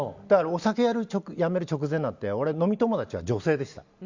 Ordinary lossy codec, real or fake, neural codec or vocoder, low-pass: Opus, 64 kbps; real; none; 7.2 kHz